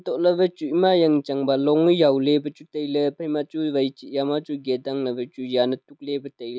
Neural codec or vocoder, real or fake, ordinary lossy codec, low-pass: none; real; none; none